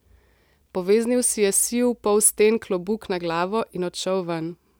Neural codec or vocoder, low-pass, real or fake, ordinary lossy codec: none; none; real; none